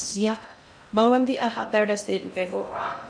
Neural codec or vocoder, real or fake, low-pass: codec, 16 kHz in and 24 kHz out, 0.6 kbps, FocalCodec, streaming, 4096 codes; fake; 9.9 kHz